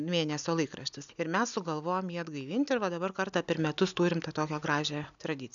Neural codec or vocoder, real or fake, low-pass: none; real; 7.2 kHz